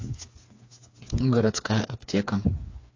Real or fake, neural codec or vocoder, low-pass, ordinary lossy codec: fake; codec, 16 kHz, 4 kbps, FreqCodec, smaller model; 7.2 kHz; AAC, 48 kbps